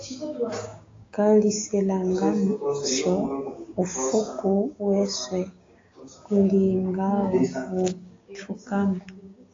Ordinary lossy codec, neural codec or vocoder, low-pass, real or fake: AAC, 32 kbps; codec, 16 kHz, 6 kbps, DAC; 7.2 kHz; fake